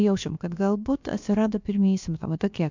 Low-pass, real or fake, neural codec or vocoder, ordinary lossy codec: 7.2 kHz; fake; codec, 16 kHz, about 1 kbps, DyCAST, with the encoder's durations; MP3, 64 kbps